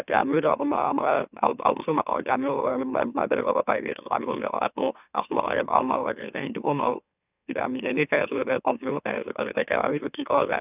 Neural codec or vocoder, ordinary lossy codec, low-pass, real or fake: autoencoder, 44.1 kHz, a latent of 192 numbers a frame, MeloTTS; none; 3.6 kHz; fake